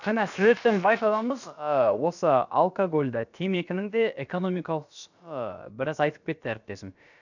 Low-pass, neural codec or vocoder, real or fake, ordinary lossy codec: 7.2 kHz; codec, 16 kHz, about 1 kbps, DyCAST, with the encoder's durations; fake; none